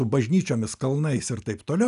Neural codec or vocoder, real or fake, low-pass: none; real; 10.8 kHz